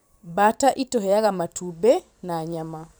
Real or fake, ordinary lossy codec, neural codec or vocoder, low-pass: fake; none; vocoder, 44.1 kHz, 128 mel bands every 512 samples, BigVGAN v2; none